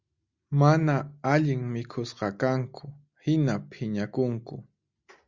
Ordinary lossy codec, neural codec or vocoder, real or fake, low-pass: Opus, 64 kbps; none; real; 7.2 kHz